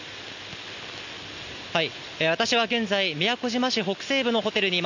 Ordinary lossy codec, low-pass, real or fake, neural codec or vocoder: none; 7.2 kHz; real; none